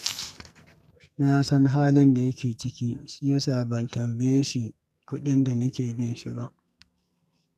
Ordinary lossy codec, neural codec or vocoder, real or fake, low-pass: none; codec, 44.1 kHz, 2.6 kbps, SNAC; fake; 14.4 kHz